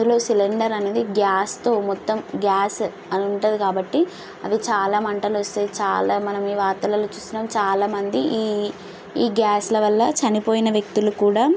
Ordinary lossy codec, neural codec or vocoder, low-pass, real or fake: none; none; none; real